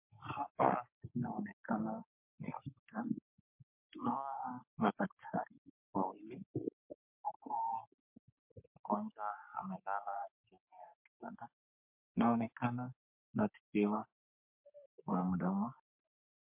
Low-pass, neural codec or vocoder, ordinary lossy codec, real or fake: 3.6 kHz; codec, 44.1 kHz, 3.4 kbps, Pupu-Codec; MP3, 32 kbps; fake